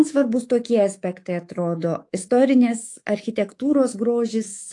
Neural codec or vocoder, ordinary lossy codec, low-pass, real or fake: codec, 24 kHz, 3.1 kbps, DualCodec; AAC, 48 kbps; 10.8 kHz; fake